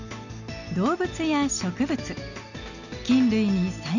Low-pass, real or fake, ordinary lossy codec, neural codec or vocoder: 7.2 kHz; real; none; none